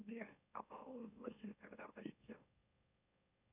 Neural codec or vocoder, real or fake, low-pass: autoencoder, 44.1 kHz, a latent of 192 numbers a frame, MeloTTS; fake; 3.6 kHz